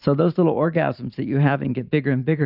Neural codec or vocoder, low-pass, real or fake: vocoder, 44.1 kHz, 128 mel bands every 512 samples, BigVGAN v2; 5.4 kHz; fake